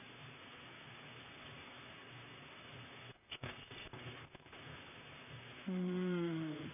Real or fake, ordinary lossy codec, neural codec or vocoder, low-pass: fake; none; vocoder, 44.1 kHz, 128 mel bands, Pupu-Vocoder; 3.6 kHz